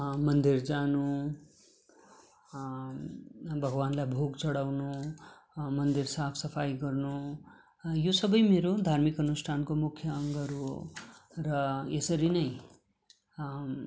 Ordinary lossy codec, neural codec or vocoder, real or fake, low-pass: none; none; real; none